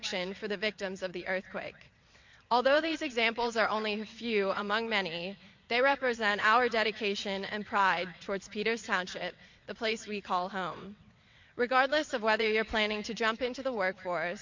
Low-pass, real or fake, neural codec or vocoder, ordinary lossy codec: 7.2 kHz; fake; vocoder, 22.05 kHz, 80 mel bands, WaveNeXt; MP3, 48 kbps